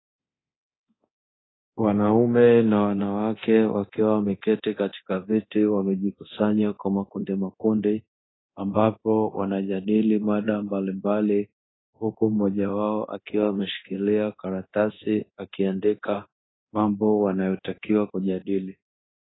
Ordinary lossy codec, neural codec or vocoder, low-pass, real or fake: AAC, 16 kbps; codec, 24 kHz, 0.9 kbps, DualCodec; 7.2 kHz; fake